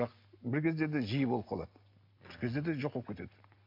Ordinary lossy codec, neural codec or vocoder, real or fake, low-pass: MP3, 48 kbps; none; real; 5.4 kHz